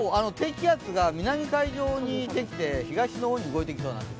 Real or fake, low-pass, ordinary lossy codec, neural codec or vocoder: real; none; none; none